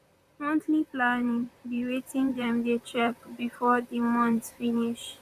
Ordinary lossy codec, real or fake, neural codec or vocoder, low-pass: none; fake; vocoder, 44.1 kHz, 128 mel bands, Pupu-Vocoder; 14.4 kHz